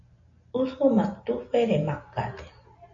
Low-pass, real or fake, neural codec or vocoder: 7.2 kHz; real; none